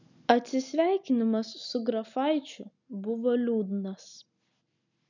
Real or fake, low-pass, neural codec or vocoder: real; 7.2 kHz; none